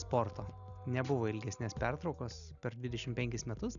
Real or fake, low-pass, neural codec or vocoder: real; 7.2 kHz; none